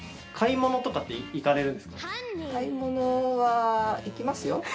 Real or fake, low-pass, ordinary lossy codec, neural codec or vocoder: real; none; none; none